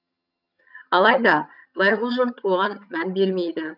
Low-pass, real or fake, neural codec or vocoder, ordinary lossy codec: 5.4 kHz; fake; vocoder, 22.05 kHz, 80 mel bands, HiFi-GAN; none